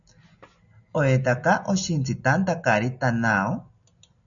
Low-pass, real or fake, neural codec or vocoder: 7.2 kHz; real; none